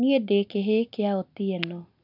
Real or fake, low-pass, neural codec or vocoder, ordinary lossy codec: real; 5.4 kHz; none; none